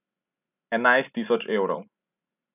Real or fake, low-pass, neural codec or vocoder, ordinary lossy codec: real; 3.6 kHz; none; none